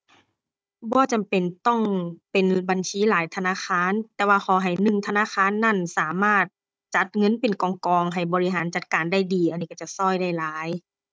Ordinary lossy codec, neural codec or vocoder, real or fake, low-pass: none; codec, 16 kHz, 16 kbps, FunCodec, trained on Chinese and English, 50 frames a second; fake; none